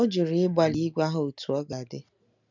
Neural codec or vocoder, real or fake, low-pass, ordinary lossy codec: none; real; 7.2 kHz; none